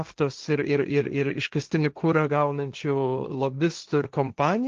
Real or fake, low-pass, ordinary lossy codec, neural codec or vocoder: fake; 7.2 kHz; Opus, 32 kbps; codec, 16 kHz, 1.1 kbps, Voila-Tokenizer